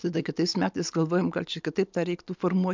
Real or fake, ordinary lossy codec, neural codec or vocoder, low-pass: real; MP3, 64 kbps; none; 7.2 kHz